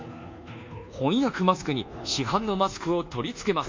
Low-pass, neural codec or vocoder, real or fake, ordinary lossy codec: 7.2 kHz; codec, 24 kHz, 1.2 kbps, DualCodec; fake; MP3, 48 kbps